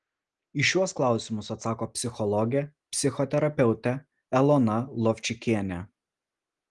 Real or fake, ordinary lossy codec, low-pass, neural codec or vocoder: real; Opus, 24 kbps; 10.8 kHz; none